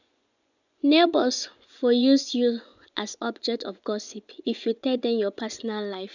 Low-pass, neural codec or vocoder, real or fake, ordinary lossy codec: 7.2 kHz; vocoder, 24 kHz, 100 mel bands, Vocos; fake; none